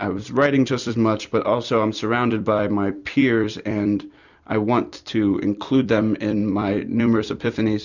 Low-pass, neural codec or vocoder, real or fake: 7.2 kHz; vocoder, 44.1 kHz, 128 mel bands every 256 samples, BigVGAN v2; fake